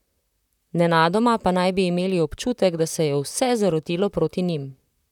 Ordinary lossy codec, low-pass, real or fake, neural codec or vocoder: none; 19.8 kHz; fake; vocoder, 44.1 kHz, 128 mel bands, Pupu-Vocoder